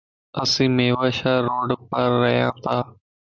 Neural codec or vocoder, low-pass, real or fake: none; 7.2 kHz; real